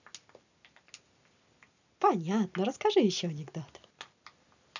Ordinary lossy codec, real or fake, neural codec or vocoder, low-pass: none; real; none; 7.2 kHz